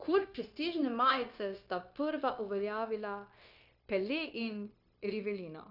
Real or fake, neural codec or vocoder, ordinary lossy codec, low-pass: fake; codec, 16 kHz, 0.9 kbps, LongCat-Audio-Codec; none; 5.4 kHz